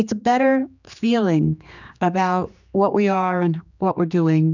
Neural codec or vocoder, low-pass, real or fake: codec, 16 kHz, 2 kbps, X-Codec, HuBERT features, trained on general audio; 7.2 kHz; fake